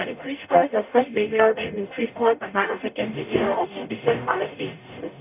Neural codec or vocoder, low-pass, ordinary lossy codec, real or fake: codec, 44.1 kHz, 0.9 kbps, DAC; 3.6 kHz; none; fake